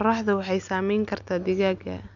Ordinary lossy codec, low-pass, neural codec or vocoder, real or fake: none; 7.2 kHz; none; real